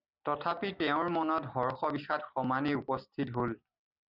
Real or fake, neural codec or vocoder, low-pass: real; none; 5.4 kHz